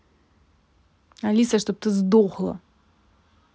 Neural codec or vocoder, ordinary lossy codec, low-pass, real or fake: none; none; none; real